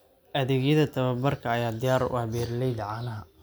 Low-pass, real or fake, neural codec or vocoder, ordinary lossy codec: none; real; none; none